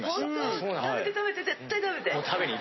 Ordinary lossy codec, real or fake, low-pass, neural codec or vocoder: MP3, 24 kbps; real; 7.2 kHz; none